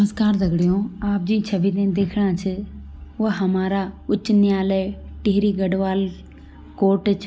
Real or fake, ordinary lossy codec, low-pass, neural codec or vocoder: real; none; none; none